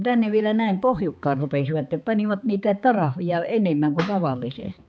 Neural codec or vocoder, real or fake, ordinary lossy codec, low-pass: codec, 16 kHz, 4 kbps, X-Codec, HuBERT features, trained on balanced general audio; fake; none; none